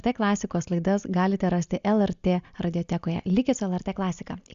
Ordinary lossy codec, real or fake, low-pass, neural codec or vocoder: Opus, 64 kbps; real; 7.2 kHz; none